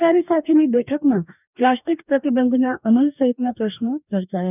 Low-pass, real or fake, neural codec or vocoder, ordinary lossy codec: 3.6 kHz; fake; codec, 44.1 kHz, 2.6 kbps, DAC; none